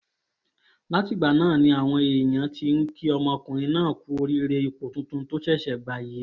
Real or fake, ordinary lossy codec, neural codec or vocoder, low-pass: real; none; none; none